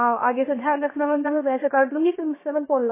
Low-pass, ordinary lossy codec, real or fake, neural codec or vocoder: 3.6 kHz; MP3, 16 kbps; fake; codec, 16 kHz, 0.7 kbps, FocalCodec